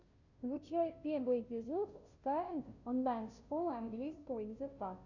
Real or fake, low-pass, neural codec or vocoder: fake; 7.2 kHz; codec, 16 kHz, 0.5 kbps, FunCodec, trained on Chinese and English, 25 frames a second